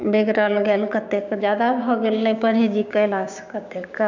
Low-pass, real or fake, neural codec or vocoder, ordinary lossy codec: 7.2 kHz; fake; codec, 16 kHz, 6 kbps, DAC; none